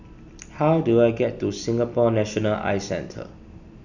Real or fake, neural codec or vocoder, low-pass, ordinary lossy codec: real; none; 7.2 kHz; none